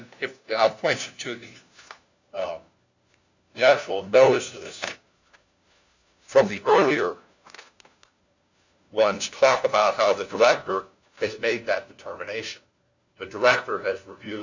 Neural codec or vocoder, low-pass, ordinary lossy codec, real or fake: codec, 16 kHz, 1 kbps, FunCodec, trained on LibriTTS, 50 frames a second; 7.2 kHz; Opus, 64 kbps; fake